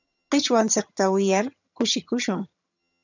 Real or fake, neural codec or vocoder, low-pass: fake; vocoder, 22.05 kHz, 80 mel bands, HiFi-GAN; 7.2 kHz